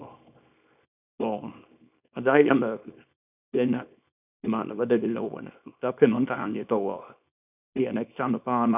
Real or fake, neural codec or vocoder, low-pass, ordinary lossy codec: fake; codec, 24 kHz, 0.9 kbps, WavTokenizer, small release; 3.6 kHz; none